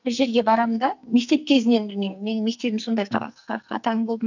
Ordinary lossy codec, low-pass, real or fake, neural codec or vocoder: none; 7.2 kHz; fake; codec, 44.1 kHz, 2.6 kbps, SNAC